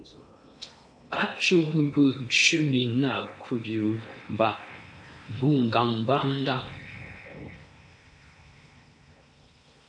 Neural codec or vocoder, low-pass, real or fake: codec, 16 kHz in and 24 kHz out, 0.8 kbps, FocalCodec, streaming, 65536 codes; 9.9 kHz; fake